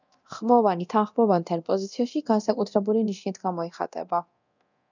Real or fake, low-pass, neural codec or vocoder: fake; 7.2 kHz; codec, 24 kHz, 0.9 kbps, DualCodec